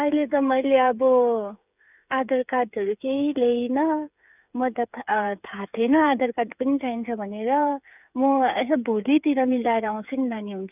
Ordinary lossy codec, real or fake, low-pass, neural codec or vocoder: none; fake; 3.6 kHz; codec, 16 kHz, 8 kbps, FreqCodec, smaller model